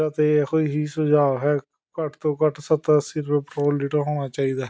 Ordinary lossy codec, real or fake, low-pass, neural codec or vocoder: none; real; none; none